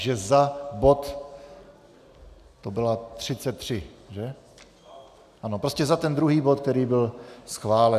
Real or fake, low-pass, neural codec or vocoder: real; 14.4 kHz; none